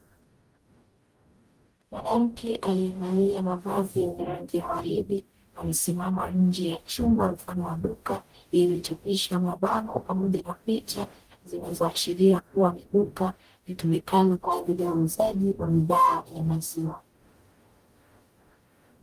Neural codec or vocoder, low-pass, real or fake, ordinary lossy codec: codec, 44.1 kHz, 0.9 kbps, DAC; 14.4 kHz; fake; Opus, 32 kbps